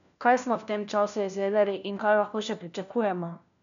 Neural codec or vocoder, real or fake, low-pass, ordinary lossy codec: codec, 16 kHz, 1 kbps, FunCodec, trained on LibriTTS, 50 frames a second; fake; 7.2 kHz; none